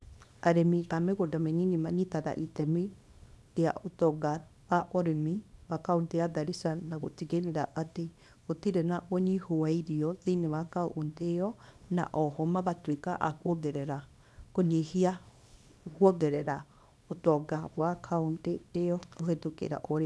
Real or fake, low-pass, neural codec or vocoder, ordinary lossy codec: fake; none; codec, 24 kHz, 0.9 kbps, WavTokenizer, small release; none